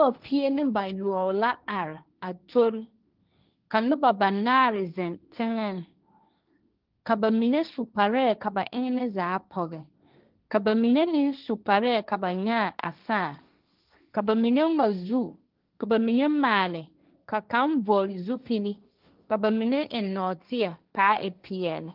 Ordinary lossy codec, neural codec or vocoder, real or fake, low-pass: Opus, 24 kbps; codec, 16 kHz, 1.1 kbps, Voila-Tokenizer; fake; 5.4 kHz